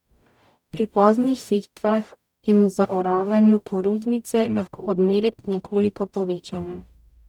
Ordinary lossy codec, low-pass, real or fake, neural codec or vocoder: none; 19.8 kHz; fake; codec, 44.1 kHz, 0.9 kbps, DAC